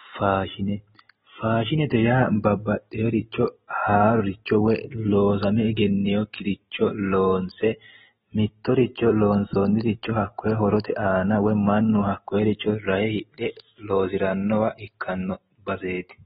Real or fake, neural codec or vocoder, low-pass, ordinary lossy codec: real; none; 19.8 kHz; AAC, 16 kbps